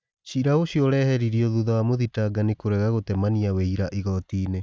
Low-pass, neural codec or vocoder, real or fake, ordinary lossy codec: none; none; real; none